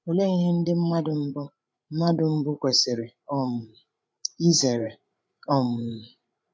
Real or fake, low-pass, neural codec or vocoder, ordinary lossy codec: fake; none; codec, 16 kHz, 8 kbps, FreqCodec, larger model; none